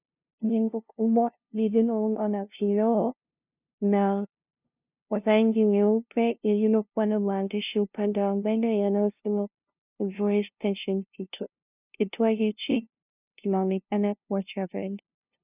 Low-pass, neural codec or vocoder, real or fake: 3.6 kHz; codec, 16 kHz, 0.5 kbps, FunCodec, trained on LibriTTS, 25 frames a second; fake